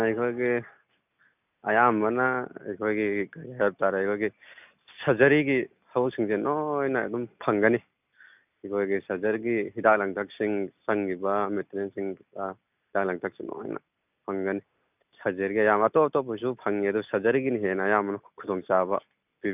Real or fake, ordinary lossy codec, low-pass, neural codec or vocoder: real; none; 3.6 kHz; none